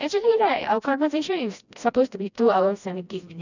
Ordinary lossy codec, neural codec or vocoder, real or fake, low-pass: none; codec, 16 kHz, 1 kbps, FreqCodec, smaller model; fake; 7.2 kHz